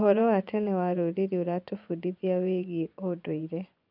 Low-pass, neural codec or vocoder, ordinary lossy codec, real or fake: 5.4 kHz; vocoder, 24 kHz, 100 mel bands, Vocos; none; fake